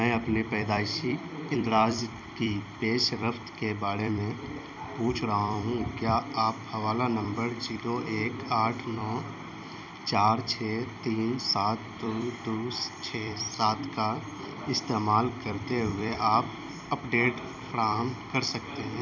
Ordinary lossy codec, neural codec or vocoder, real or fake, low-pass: none; vocoder, 44.1 kHz, 128 mel bands every 256 samples, BigVGAN v2; fake; 7.2 kHz